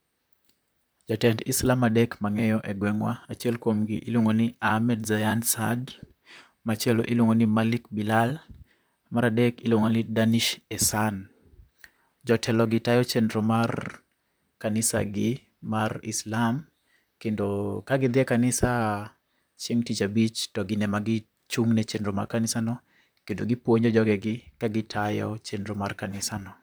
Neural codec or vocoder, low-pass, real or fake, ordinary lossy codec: vocoder, 44.1 kHz, 128 mel bands, Pupu-Vocoder; none; fake; none